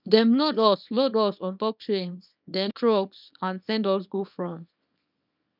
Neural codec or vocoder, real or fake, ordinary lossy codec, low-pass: codec, 24 kHz, 0.9 kbps, WavTokenizer, small release; fake; none; 5.4 kHz